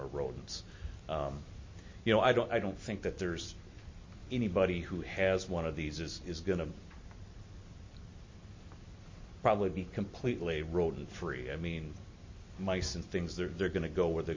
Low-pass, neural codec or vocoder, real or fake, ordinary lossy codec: 7.2 kHz; none; real; MP3, 32 kbps